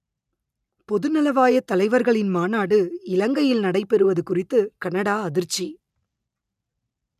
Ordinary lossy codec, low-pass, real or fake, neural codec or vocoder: none; 14.4 kHz; real; none